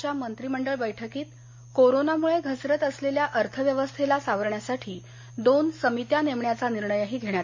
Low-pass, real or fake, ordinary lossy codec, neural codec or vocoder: 7.2 kHz; real; MP3, 32 kbps; none